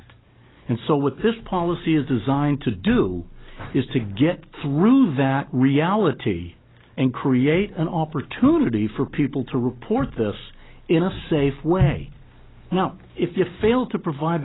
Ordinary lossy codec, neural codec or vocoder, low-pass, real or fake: AAC, 16 kbps; none; 7.2 kHz; real